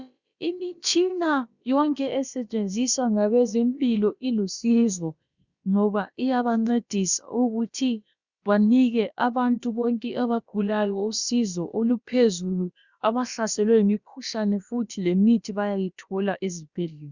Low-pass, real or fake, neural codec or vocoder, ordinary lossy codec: 7.2 kHz; fake; codec, 16 kHz, about 1 kbps, DyCAST, with the encoder's durations; Opus, 64 kbps